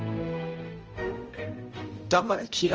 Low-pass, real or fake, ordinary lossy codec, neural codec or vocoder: 7.2 kHz; fake; Opus, 24 kbps; codec, 16 kHz, 0.5 kbps, X-Codec, HuBERT features, trained on balanced general audio